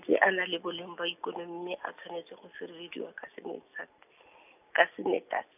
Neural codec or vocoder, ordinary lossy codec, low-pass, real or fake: none; none; 3.6 kHz; real